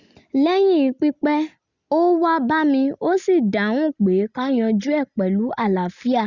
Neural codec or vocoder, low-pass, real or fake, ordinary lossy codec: none; 7.2 kHz; real; Opus, 64 kbps